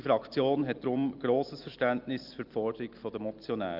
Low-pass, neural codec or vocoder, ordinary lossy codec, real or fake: 5.4 kHz; none; Opus, 32 kbps; real